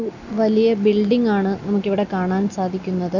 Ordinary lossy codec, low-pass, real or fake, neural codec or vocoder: none; 7.2 kHz; real; none